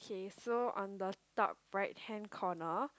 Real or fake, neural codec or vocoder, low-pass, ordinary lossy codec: real; none; none; none